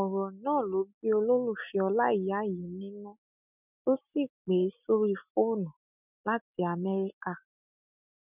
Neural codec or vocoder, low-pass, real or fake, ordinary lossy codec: none; 3.6 kHz; real; none